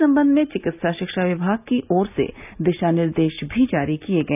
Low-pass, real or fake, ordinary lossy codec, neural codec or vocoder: 3.6 kHz; real; none; none